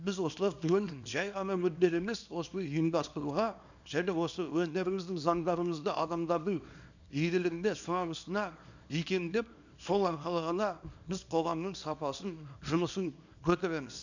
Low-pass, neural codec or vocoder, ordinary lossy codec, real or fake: 7.2 kHz; codec, 24 kHz, 0.9 kbps, WavTokenizer, small release; none; fake